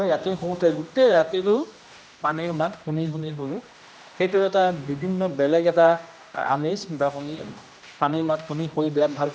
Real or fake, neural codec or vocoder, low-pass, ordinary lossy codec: fake; codec, 16 kHz, 1 kbps, X-Codec, HuBERT features, trained on general audio; none; none